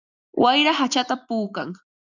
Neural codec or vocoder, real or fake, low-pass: none; real; 7.2 kHz